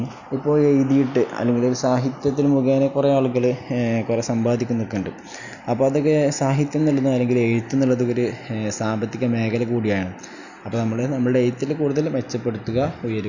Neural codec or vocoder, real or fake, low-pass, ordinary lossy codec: none; real; 7.2 kHz; none